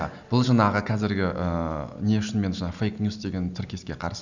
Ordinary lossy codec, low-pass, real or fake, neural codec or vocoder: none; 7.2 kHz; real; none